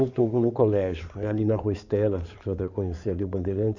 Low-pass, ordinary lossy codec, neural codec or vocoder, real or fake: 7.2 kHz; none; codec, 16 kHz in and 24 kHz out, 2.2 kbps, FireRedTTS-2 codec; fake